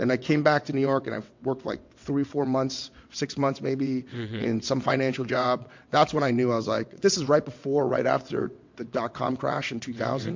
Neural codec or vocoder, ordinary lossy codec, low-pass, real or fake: vocoder, 22.05 kHz, 80 mel bands, WaveNeXt; MP3, 48 kbps; 7.2 kHz; fake